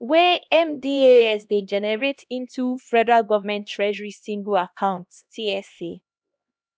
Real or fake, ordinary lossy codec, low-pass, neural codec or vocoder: fake; none; none; codec, 16 kHz, 1 kbps, X-Codec, HuBERT features, trained on LibriSpeech